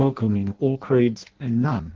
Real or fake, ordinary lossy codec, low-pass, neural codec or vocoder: fake; Opus, 16 kbps; 7.2 kHz; codec, 16 kHz in and 24 kHz out, 0.6 kbps, FireRedTTS-2 codec